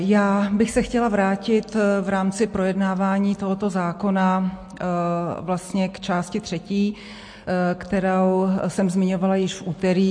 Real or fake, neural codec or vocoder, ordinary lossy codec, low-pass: real; none; MP3, 48 kbps; 9.9 kHz